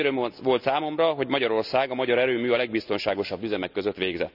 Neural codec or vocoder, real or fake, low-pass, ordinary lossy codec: none; real; 5.4 kHz; none